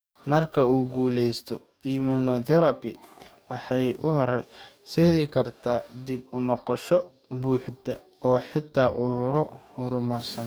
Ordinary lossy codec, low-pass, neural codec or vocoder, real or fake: none; none; codec, 44.1 kHz, 2.6 kbps, DAC; fake